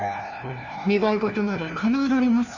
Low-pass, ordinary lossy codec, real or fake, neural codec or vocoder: 7.2 kHz; none; fake; codec, 16 kHz, 1 kbps, FunCodec, trained on LibriTTS, 50 frames a second